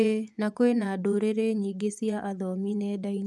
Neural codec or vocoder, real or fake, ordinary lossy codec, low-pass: vocoder, 24 kHz, 100 mel bands, Vocos; fake; none; none